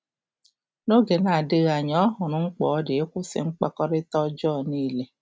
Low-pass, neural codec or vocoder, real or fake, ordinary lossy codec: none; none; real; none